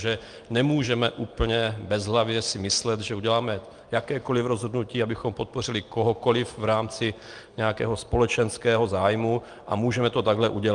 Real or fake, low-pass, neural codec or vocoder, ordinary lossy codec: real; 9.9 kHz; none; Opus, 32 kbps